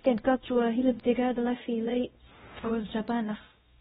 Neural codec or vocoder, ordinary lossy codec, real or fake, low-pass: codec, 16 kHz, 0.5 kbps, X-Codec, WavLM features, trained on Multilingual LibriSpeech; AAC, 16 kbps; fake; 7.2 kHz